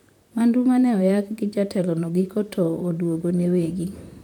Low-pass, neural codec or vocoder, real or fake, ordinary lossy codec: 19.8 kHz; vocoder, 44.1 kHz, 128 mel bands, Pupu-Vocoder; fake; none